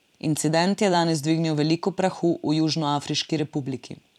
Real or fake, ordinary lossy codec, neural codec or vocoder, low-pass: fake; none; vocoder, 44.1 kHz, 128 mel bands every 256 samples, BigVGAN v2; 19.8 kHz